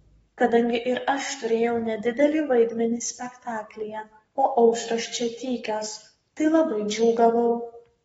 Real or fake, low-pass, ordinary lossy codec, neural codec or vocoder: fake; 19.8 kHz; AAC, 24 kbps; codec, 44.1 kHz, 7.8 kbps, Pupu-Codec